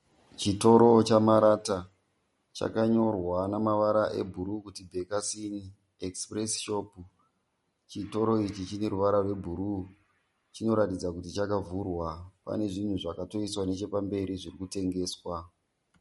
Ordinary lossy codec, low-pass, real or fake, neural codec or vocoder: MP3, 48 kbps; 19.8 kHz; real; none